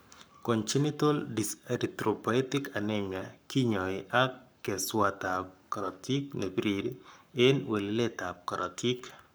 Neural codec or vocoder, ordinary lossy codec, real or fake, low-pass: codec, 44.1 kHz, 7.8 kbps, Pupu-Codec; none; fake; none